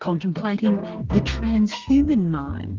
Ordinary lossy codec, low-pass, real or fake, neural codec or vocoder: Opus, 32 kbps; 7.2 kHz; fake; codec, 32 kHz, 1.9 kbps, SNAC